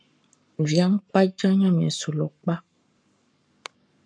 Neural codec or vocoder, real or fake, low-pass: codec, 44.1 kHz, 7.8 kbps, Pupu-Codec; fake; 9.9 kHz